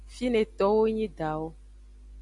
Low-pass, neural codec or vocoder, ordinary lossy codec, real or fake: 10.8 kHz; none; AAC, 64 kbps; real